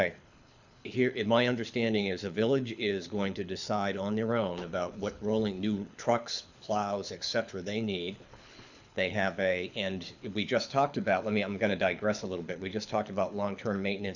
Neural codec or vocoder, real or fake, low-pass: codec, 24 kHz, 6 kbps, HILCodec; fake; 7.2 kHz